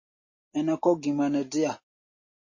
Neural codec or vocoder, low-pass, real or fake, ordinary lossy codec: none; 7.2 kHz; real; MP3, 32 kbps